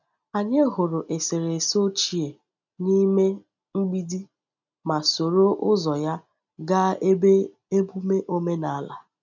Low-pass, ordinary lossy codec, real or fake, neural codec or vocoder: 7.2 kHz; none; real; none